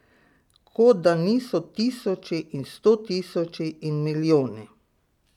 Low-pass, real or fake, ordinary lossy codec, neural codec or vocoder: 19.8 kHz; real; none; none